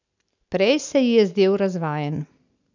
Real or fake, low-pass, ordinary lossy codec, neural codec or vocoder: real; 7.2 kHz; none; none